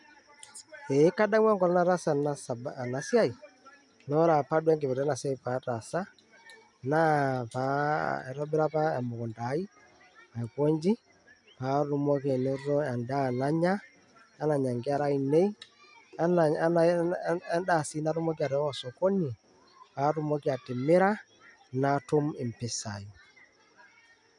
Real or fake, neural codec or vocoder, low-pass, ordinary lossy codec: real; none; 10.8 kHz; none